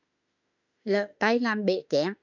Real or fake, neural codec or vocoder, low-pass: fake; autoencoder, 48 kHz, 32 numbers a frame, DAC-VAE, trained on Japanese speech; 7.2 kHz